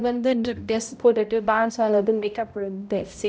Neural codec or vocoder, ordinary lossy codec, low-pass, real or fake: codec, 16 kHz, 0.5 kbps, X-Codec, HuBERT features, trained on balanced general audio; none; none; fake